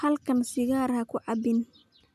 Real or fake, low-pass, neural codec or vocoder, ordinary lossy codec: real; 14.4 kHz; none; none